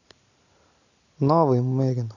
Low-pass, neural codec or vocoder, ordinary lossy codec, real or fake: 7.2 kHz; none; none; real